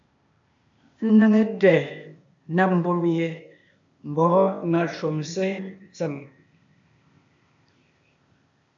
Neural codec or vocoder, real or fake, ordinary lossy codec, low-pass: codec, 16 kHz, 0.8 kbps, ZipCodec; fake; AAC, 64 kbps; 7.2 kHz